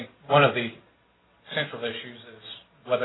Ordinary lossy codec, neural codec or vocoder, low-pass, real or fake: AAC, 16 kbps; none; 7.2 kHz; real